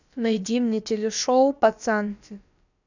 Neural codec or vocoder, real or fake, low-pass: codec, 16 kHz, about 1 kbps, DyCAST, with the encoder's durations; fake; 7.2 kHz